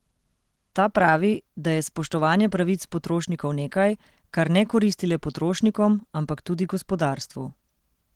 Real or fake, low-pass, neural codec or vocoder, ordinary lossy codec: real; 19.8 kHz; none; Opus, 16 kbps